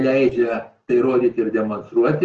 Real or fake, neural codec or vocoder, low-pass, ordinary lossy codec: real; none; 7.2 kHz; Opus, 16 kbps